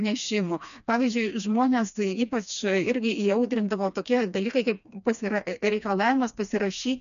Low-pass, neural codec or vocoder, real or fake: 7.2 kHz; codec, 16 kHz, 2 kbps, FreqCodec, smaller model; fake